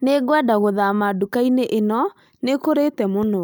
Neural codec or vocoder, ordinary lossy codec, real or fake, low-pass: none; none; real; none